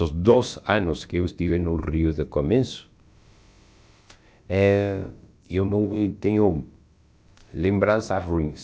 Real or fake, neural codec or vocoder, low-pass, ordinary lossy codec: fake; codec, 16 kHz, about 1 kbps, DyCAST, with the encoder's durations; none; none